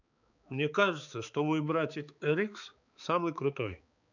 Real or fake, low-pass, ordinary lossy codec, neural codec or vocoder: fake; 7.2 kHz; none; codec, 16 kHz, 4 kbps, X-Codec, HuBERT features, trained on balanced general audio